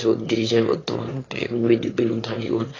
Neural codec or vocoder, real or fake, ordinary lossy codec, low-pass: autoencoder, 22.05 kHz, a latent of 192 numbers a frame, VITS, trained on one speaker; fake; AAC, 32 kbps; 7.2 kHz